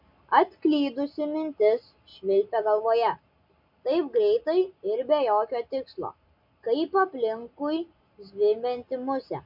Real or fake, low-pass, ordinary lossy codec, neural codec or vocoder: real; 5.4 kHz; MP3, 48 kbps; none